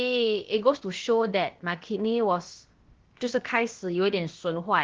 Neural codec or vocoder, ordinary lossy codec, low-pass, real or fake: codec, 16 kHz, about 1 kbps, DyCAST, with the encoder's durations; Opus, 16 kbps; 7.2 kHz; fake